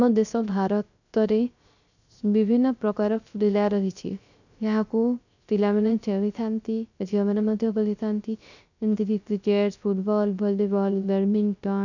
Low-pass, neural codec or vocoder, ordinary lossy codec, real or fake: 7.2 kHz; codec, 16 kHz, 0.3 kbps, FocalCodec; none; fake